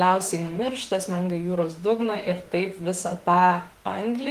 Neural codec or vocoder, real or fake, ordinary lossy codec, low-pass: autoencoder, 48 kHz, 32 numbers a frame, DAC-VAE, trained on Japanese speech; fake; Opus, 24 kbps; 14.4 kHz